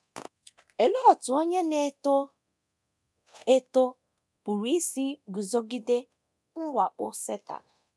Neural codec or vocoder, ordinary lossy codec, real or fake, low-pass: codec, 24 kHz, 0.9 kbps, DualCodec; none; fake; none